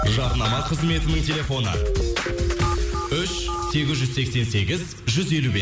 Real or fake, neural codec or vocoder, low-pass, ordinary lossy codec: real; none; none; none